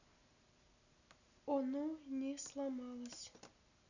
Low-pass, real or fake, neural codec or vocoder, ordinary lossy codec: 7.2 kHz; real; none; none